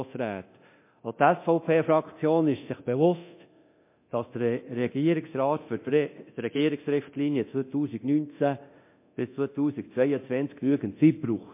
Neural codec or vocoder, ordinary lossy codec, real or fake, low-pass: codec, 24 kHz, 0.9 kbps, DualCodec; MP3, 24 kbps; fake; 3.6 kHz